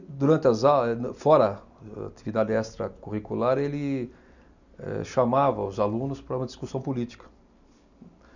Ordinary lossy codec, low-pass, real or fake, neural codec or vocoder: none; 7.2 kHz; real; none